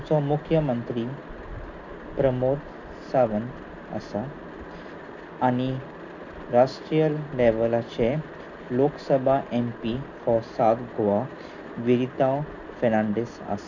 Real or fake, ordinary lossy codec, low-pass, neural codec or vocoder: real; none; 7.2 kHz; none